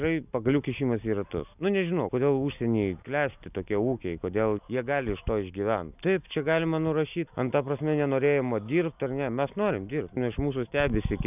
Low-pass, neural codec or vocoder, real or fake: 3.6 kHz; none; real